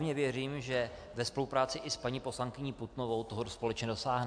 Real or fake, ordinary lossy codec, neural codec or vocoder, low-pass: real; AAC, 64 kbps; none; 9.9 kHz